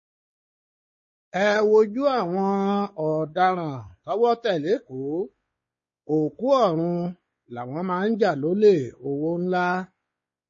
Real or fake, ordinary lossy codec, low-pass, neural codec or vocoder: fake; MP3, 32 kbps; 7.2 kHz; codec, 16 kHz, 4 kbps, X-Codec, WavLM features, trained on Multilingual LibriSpeech